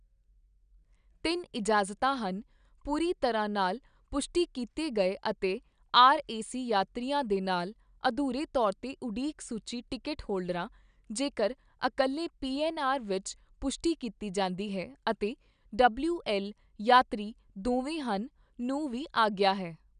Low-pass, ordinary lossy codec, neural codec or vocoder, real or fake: 9.9 kHz; none; none; real